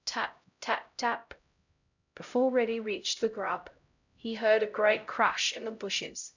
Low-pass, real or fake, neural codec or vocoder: 7.2 kHz; fake; codec, 16 kHz, 0.5 kbps, X-Codec, HuBERT features, trained on LibriSpeech